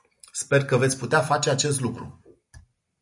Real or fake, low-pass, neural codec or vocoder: real; 10.8 kHz; none